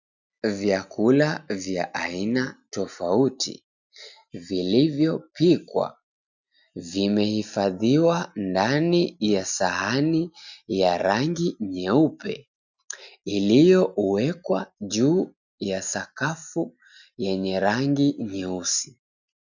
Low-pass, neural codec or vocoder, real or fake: 7.2 kHz; none; real